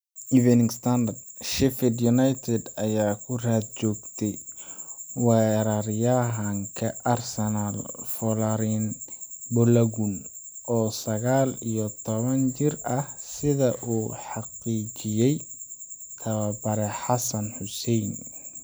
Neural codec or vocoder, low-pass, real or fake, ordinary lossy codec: none; none; real; none